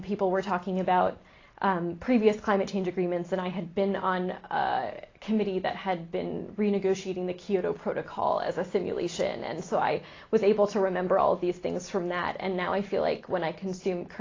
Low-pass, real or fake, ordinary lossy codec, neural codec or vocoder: 7.2 kHz; real; AAC, 32 kbps; none